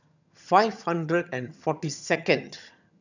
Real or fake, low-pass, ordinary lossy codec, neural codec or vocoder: fake; 7.2 kHz; none; vocoder, 22.05 kHz, 80 mel bands, HiFi-GAN